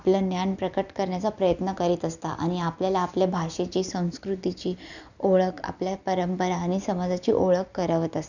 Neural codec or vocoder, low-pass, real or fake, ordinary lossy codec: none; 7.2 kHz; real; none